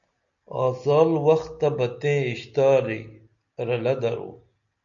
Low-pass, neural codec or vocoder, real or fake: 7.2 kHz; none; real